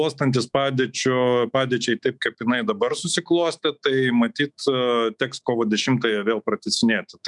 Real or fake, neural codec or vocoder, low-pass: fake; autoencoder, 48 kHz, 128 numbers a frame, DAC-VAE, trained on Japanese speech; 10.8 kHz